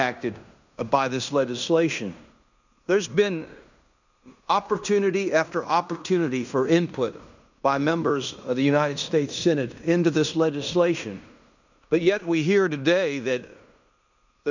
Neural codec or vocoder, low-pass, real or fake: codec, 16 kHz in and 24 kHz out, 0.9 kbps, LongCat-Audio-Codec, fine tuned four codebook decoder; 7.2 kHz; fake